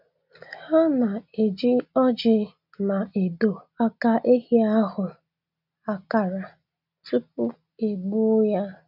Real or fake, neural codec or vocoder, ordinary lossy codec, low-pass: real; none; none; 5.4 kHz